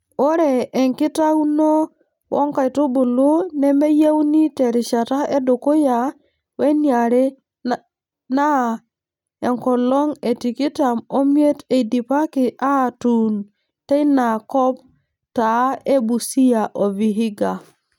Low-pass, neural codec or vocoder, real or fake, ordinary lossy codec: 19.8 kHz; none; real; none